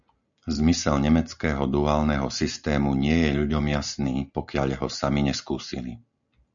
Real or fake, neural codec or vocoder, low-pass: real; none; 7.2 kHz